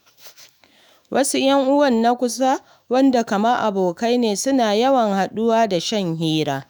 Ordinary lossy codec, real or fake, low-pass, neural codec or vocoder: none; fake; none; autoencoder, 48 kHz, 128 numbers a frame, DAC-VAE, trained on Japanese speech